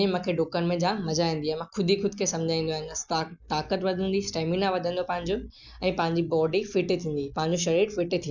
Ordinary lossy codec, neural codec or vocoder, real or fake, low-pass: none; none; real; 7.2 kHz